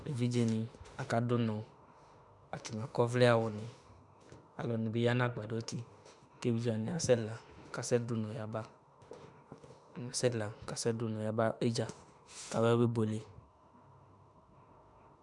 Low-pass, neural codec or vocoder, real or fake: 10.8 kHz; autoencoder, 48 kHz, 32 numbers a frame, DAC-VAE, trained on Japanese speech; fake